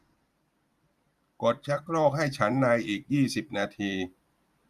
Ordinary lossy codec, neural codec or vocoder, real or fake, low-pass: Opus, 64 kbps; vocoder, 44.1 kHz, 128 mel bands every 512 samples, BigVGAN v2; fake; 14.4 kHz